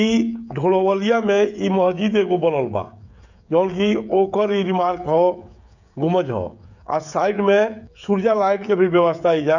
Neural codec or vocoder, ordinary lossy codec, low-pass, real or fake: codec, 16 kHz, 16 kbps, FreqCodec, smaller model; none; 7.2 kHz; fake